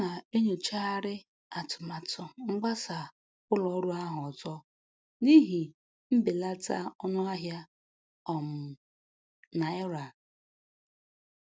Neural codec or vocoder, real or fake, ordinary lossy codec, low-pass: none; real; none; none